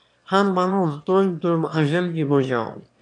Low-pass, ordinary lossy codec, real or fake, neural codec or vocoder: 9.9 kHz; AAC, 64 kbps; fake; autoencoder, 22.05 kHz, a latent of 192 numbers a frame, VITS, trained on one speaker